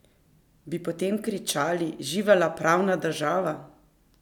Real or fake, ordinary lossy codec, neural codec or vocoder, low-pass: real; none; none; 19.8 kHz